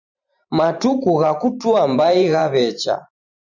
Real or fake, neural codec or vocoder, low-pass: fake; vocoder, 44.1 kHz, 128 mel bands every 512 samples, BigVGAN v2; 7.2 kHz